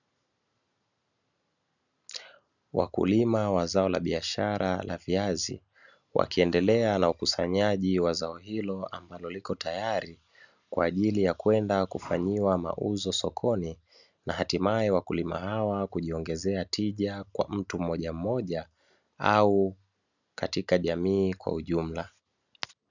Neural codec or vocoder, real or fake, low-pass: none; real; 7.2 kHz